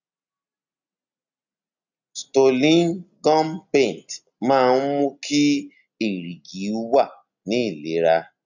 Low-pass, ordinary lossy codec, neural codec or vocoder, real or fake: 7.2 kHz; none; none; real